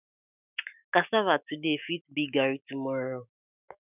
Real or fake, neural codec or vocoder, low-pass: fake; autoencoder, 48 kHz, 128 numbers a frame, DAC-VAE, trained on Japanese speech; 3.6 kHz